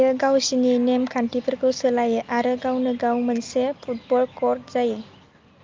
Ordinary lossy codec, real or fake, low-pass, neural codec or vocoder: Opus, 24 kbps; real; 7.2 kHz; none